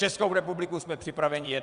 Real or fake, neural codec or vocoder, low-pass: fake; vocoder, 22.05 kHz, 80 mel bands, WaveNeXt; 9.9 kHz